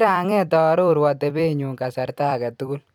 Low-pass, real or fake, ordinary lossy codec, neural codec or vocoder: 19.8 kHz; fake; none; vocoder, 44.1 kHz, 128 mel bands every 256 samples, BigVGAN v2